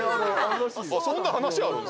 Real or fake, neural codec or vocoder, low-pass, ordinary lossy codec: real; none; none; none